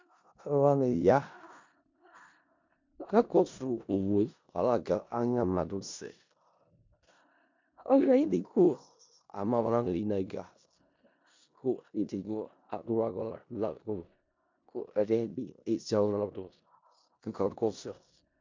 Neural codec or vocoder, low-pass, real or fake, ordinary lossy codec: codec, 16 kHz in and 24 kHz out, 0.4 kbps, LongCat-Audio-Codec, four codebook decoder; 7.2 kHz; fake; MP3, 64 kbps